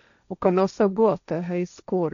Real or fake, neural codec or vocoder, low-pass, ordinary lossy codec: fake; codec, 16 kHz, 1.1 kbps, Voila-Tokenizer; 7.2 kHz; none